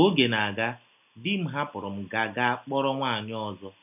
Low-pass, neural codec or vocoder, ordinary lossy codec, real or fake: 3.6 kHz; none; none; real